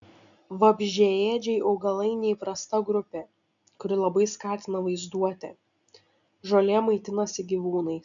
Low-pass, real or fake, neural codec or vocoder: 7.2 kHz; real; none